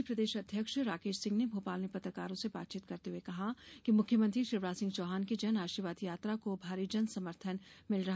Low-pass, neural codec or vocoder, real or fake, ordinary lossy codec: none; none; real; none